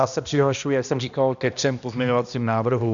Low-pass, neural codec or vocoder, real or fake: 7.2 kHz; codec, 16 kHz, 1 kbps, X-Codec, HuBERT features, trained on balanced general audio; fake